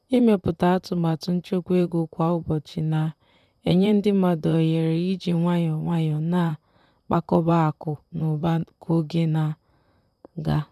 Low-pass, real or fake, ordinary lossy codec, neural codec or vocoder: 14.4 kHz; fake; none; vocoder, 44.1 kHz, 128 mel bands, Pupu-Vocoder